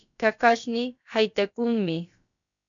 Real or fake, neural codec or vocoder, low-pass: fake; codec, 16 kHz, about 1 kbps, DyCAST, with the encoder's durations; 7.2 kHz